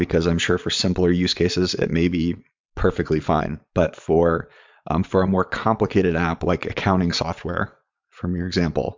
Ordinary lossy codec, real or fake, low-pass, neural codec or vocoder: MP3, 64 kbps; fake; 7.2 kHz; vocoder, 22.05 kHz, 80 mel bands, Vocos